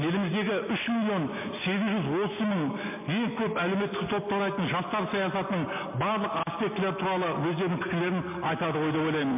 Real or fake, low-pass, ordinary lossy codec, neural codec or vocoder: real; 3.6 kHz; MP3, 24 kbps; none